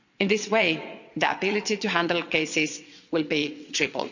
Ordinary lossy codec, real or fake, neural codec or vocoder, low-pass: none; fake; vocoder, 22.05 kHz, 80 mel bands, WaveNeXt; 7.2 kHz